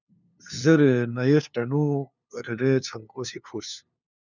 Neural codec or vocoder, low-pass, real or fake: codec, 16 kHz, 2 kbps, FunCodec, trained on LibriTTS, 25 frames a second; 7.2 kHz; fake